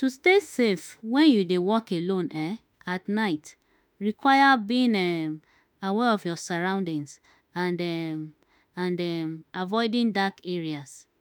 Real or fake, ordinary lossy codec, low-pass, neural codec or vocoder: fake; none; none; autoencoder, 48 kHz, 32 numbers a frame, DAC-VAE, trained on Japanese speech